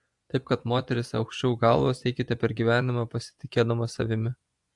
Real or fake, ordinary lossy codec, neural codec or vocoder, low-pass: fake; AAC, 64 kbps; vocoder, 24 kHz, 100 mel bands, Vocos; 10.8 kHz